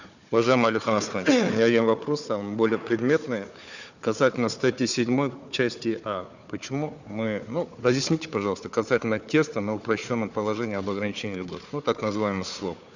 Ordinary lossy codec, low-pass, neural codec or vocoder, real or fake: none; 7.2 kHz; codec, 16 kHz, 4 kbps, FunCodec, trained on Chinese and English, 50 frames a second; fake